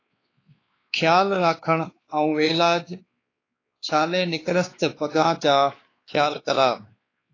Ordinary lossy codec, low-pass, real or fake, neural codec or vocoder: AAC, 32 kbps; 7.2 kHz; fake; codec, 16 kHz, 2 kbps, X-Codec, WavLM features, trained on Multilingual LibriSpeech